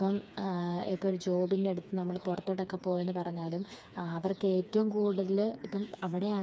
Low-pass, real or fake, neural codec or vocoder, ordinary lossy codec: none; fake; codec, 16 kHz, 4 kbps, FreqCodec, smaller model; none